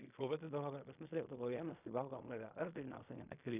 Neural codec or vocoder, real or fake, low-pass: codec, 16 kHz in and 24 kHz out, 0.4 kbps, LongCat-Audio-Codec, fine tuned four codebook decoder; fake; 3.6 kHz